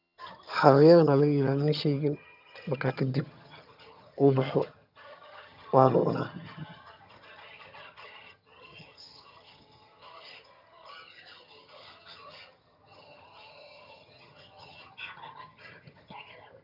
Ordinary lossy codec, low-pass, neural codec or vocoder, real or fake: none; 5.4 kHz; vocoder, 22.05 kHz, 80 mel bands, HiFi-GAN; fake